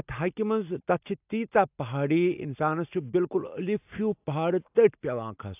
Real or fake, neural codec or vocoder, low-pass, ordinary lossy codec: real; none; 3.6 kHz; AAC, 32 kbps